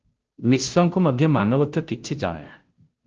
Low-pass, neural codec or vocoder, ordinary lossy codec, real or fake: 7.2 kHz; codec, 16 kHz, 0.5 kbps, FunCodec, trained on Chinese and English, 25 frames a second; Opus, 16 kbps; fake